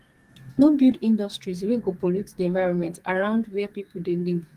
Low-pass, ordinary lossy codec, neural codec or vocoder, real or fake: 14.4 kHz; Opus, 24 kbps; codec, 44.1 kHz, 2.6 kbps, SNAC; fake